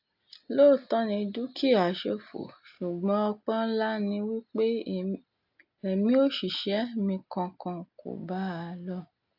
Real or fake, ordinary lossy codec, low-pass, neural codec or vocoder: real; AAC, 48 kbps; 5.4 kHz; none